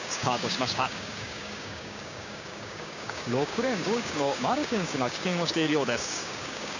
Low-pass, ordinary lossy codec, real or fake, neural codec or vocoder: 7.2 kHz; none; fake; codec, 16 kHz, 6 kbps, DAC